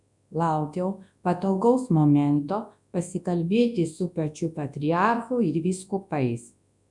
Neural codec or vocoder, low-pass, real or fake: codec, 24 kHz, 0.9 kbps, WavTokenizer, large speech release; 10.8 kHz; fake